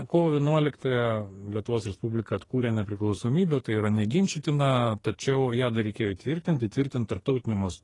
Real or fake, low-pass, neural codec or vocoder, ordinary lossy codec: fake; 10.8 kHz; codec, 44.1 kHz, 2.6 kbps, SNAC; AAC, 32 kbps